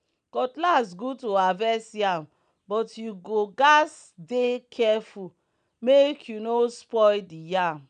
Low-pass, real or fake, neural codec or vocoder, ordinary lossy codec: 9.9 kHz; real; none; none